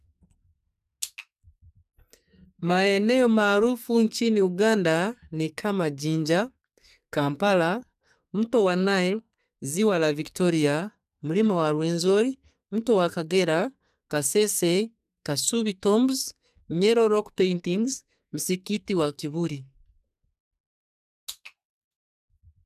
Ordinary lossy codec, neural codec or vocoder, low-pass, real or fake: none; codec, 44.1 kHz, 2.6 kbps, SNAC; 14.4 kHz; fake